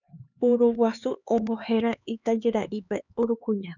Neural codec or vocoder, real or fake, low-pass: codec, 16 kHz, 4 kbps, X-Codec, HuBERT features, trained on LibriSpeech; fake; 7.2 kHz